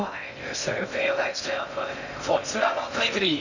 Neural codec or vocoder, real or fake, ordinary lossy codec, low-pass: codec, 16 kHz in and 24 kHz out, 0.6 kbps, FocalCodec, streaming, 2048 codes; fake; none; 7.2 kHz